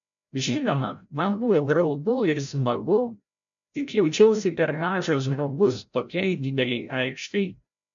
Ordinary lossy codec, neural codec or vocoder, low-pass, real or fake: MP3, 64 kbps; codec, 16 kHz, 0.5 kbps, FreqCodec, larger model; 7.2 kHz; fake